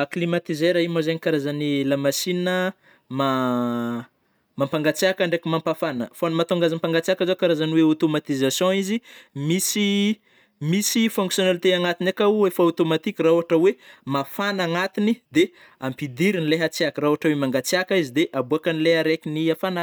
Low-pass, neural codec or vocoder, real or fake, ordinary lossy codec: none; none; real; none